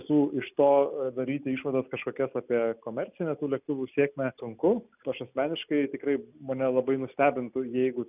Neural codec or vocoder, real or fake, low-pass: none; real; 3.6 kHz